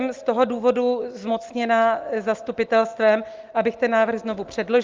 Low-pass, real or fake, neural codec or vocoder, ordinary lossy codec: 7.2 kHz; real; none; Opus, 32 kbps